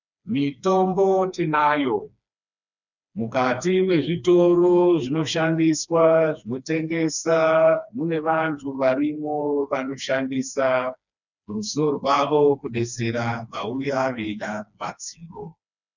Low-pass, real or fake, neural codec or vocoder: 7.2 kHz; fake; codec, 16 kHz, 2 kbps, FreqCodec, smaller model